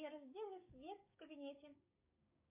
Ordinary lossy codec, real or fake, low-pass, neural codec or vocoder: MP3, 24 kbps; fake; 3.6 kHz; codec, 16 kHz, 6 kbps, DAC